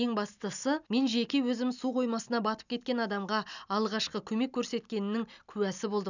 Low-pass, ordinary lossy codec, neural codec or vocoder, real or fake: 7.2 kHz; none; none; real